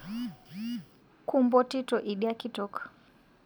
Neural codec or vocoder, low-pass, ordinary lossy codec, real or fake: none; none; none; real